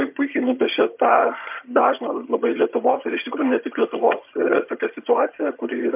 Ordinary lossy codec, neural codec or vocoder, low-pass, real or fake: MP3, 32 kbps; vocoder, 22.05 kHz, 80 mel bands, HiFi-GAN; 3.6 kHz; fake